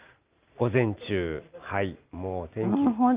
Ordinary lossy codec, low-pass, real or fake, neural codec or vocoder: Opus, 24 kbps; 3.6 kHz; real; none